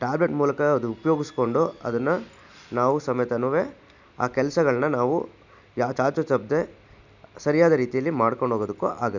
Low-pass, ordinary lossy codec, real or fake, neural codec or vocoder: 7.2 kHz; none; real; none